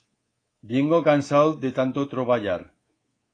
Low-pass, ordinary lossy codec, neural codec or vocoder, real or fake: 9.9 kHz; AAC, 32 kbps; codec, 24 kHz, 3.1 kbps, DualCodec; fake